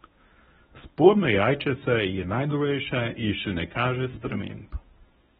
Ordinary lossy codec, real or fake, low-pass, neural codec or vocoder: AAC, 16 kbps; fake; 10.8 kHz; codec, 24 kHz, 0.9 kbps, WavTokenizer, medium speech release version 1